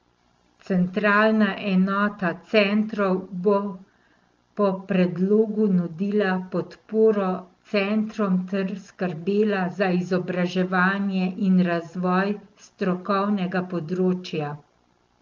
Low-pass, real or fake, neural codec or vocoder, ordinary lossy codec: 7.2 kHz; real; none; Opus, 32 kbps